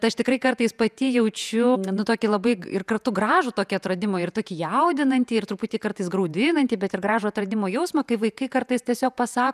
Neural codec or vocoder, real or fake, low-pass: vocoder, 48 kHz, 128 mel bands, Vocos; fake; 14.4 kHz